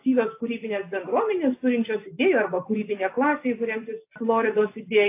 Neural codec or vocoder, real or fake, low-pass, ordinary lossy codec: none; real; 3.6 kHz; AAC, 24 kbps